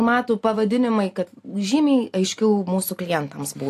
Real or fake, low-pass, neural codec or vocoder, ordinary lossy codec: real; 14.4 kHz; none; AAC, 64 kbps